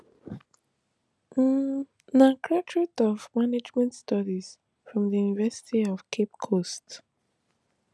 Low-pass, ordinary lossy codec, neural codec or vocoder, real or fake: none; none; none; real